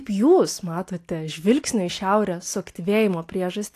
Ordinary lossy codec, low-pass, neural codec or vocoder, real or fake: AAC, 64 kbps; 14.4 kHz; none; real